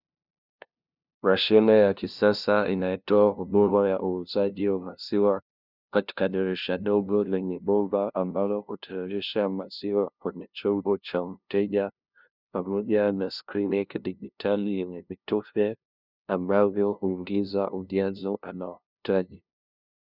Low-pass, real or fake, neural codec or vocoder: 5.4 kHz; fake; codec, 16 kHz, 0.5 kbps, FunCodec, trained on LibriTTS, 25 frames a second